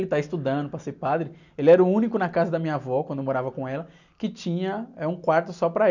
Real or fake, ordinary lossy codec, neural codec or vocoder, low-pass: real; none; none; 7.2 kHz